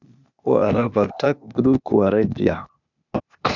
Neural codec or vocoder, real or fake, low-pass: codec, 16 kHz, 0.8 kbps, ZipCodec; fake; 7.2 kHz